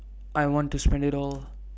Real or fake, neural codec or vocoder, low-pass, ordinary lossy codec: real; none; none; none